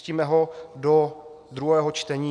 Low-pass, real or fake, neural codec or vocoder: 9.9 kHz; real; none